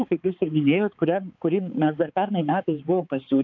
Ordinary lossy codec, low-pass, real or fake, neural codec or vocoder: AAC, 48 kbps; 7.2 kHz; fake; codec, 16 kHz, 4 kbps, X-Codec, HuBERT features, trained on balanced general audio